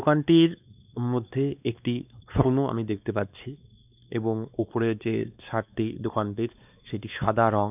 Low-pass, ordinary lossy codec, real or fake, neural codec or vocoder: 3.6 kHz; none; fake; codec, 16 kHz, 2 kbps, X-Codec, WavLM features, trained on Multilingual LibriSpeech